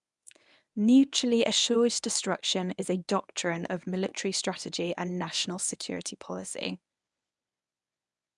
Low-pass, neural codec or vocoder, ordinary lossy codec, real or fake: 10.8 kHz; codec, 24 kHz, 0.9 kbps, WavTokenizer, medium speech release version 1; none; fake